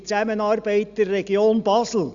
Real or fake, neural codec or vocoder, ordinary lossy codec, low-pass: real; none; Opus, 64 kbps; 7.2 kHz